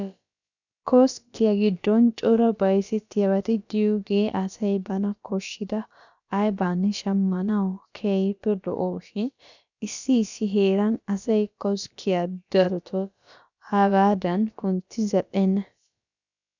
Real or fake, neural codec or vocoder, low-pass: fake; codec, 16 kHz, about 1 kbps, DyCAST, with the encoder's durations; 7.2 kHz